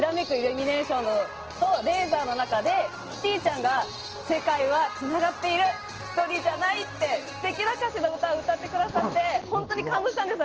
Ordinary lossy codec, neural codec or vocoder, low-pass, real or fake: Opus, 16 kbps; none; 7.2 kHz; real